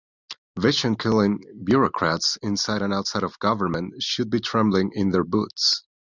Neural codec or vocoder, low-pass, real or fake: none; 7.2 kHz; real